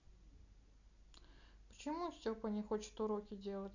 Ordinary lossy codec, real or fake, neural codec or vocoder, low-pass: none; real; none; 7.2 kHz